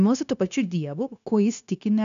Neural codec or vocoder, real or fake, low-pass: codec, 16 kHz, 0.9 kbps, LongCat-Audio-Codec; fake; 7.2 kHz